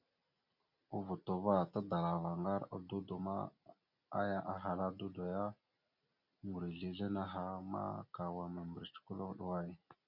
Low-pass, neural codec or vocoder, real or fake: 5.4 kHz; none; real